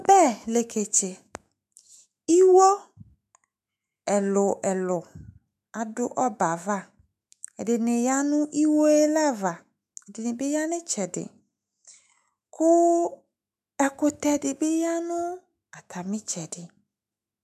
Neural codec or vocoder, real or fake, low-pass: autoencoder, 48 kHz, 128 numbers a frame, DAC-VAE, trained on Japanese speech; fake; 14.4 kHz